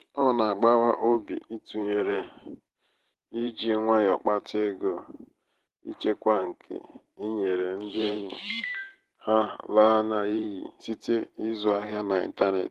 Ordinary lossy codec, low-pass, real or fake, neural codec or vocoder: Opus, 16 kbps; 14.4 kHz; fake; vocoder, 44.1 kHz, 128 mel bands every 512 samples, BigVGAN v2